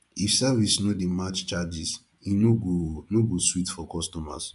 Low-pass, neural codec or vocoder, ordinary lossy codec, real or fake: 10.8 kHz; vocoder, 24 kHz, 100 mel bands, Vocos; none; fake